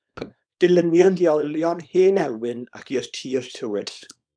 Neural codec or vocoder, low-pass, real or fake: codec, 24 kHz, 0.9 kbps, WavTokenizer, small release; 9.9 kHz; fake